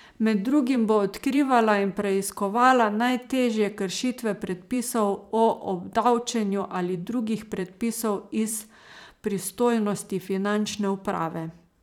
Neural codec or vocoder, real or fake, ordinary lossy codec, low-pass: vocoder, 44.1 kHz, 128 mel bands every 512 samples, BigVGAN v2; fake; none; 19.8 kHz